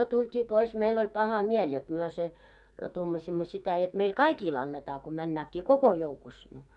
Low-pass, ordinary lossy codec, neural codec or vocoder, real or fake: 10.8 kHz; none; codec, 44.1 kHz, 2.6 kbps, SNAC; fake